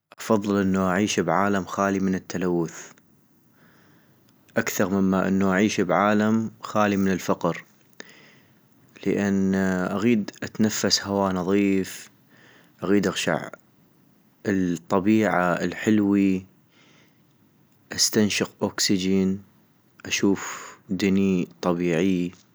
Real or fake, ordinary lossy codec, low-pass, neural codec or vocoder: real; none; none; none